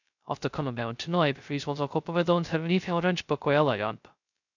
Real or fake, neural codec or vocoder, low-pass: fake; codec, 16 kHz, 0.2 kbps, FocalCodec; 7.2 kHz